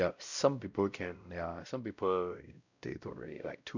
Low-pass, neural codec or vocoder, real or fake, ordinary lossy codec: 7.2 kHz; codec, 16 kHz, 0.5 kbps, X-Codec, WavLM features, trained on Multilingual LibriSpeech; fake; none